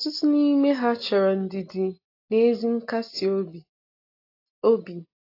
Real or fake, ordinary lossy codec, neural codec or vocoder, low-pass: real; AAC, 32 kbps; none; 5.4 kHz